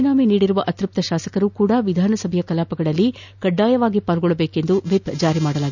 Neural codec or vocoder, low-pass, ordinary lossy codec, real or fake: none; 7.2 kHz; none; real